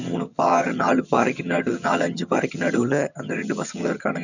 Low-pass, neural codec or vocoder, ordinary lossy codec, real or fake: 7.2 kHz; vocoder, 22.05 kHz, 80 mel bands, HiFi-GAN; none; fake